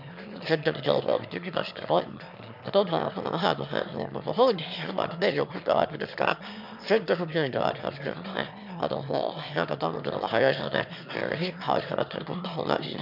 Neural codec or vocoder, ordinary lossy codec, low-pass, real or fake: autoencoder, 22.05 kHz, a latent of 192 numbers a frame, VITS, trained on one speaker; none; 5.4 kHz; fake